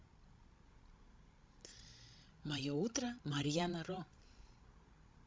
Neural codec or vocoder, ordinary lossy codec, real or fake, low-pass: codec, 16 kHz, 16 kbps, FreqCodec, larger model; none; fake; none